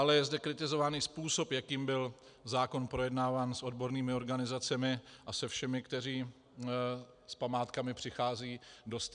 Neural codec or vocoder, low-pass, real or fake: none; 9.9 kHz; real